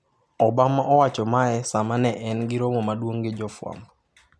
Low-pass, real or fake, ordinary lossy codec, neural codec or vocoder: none; real; none; none